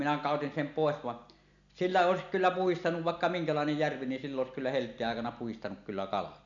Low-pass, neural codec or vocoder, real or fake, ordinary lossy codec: 7.2 kHz; none; real; none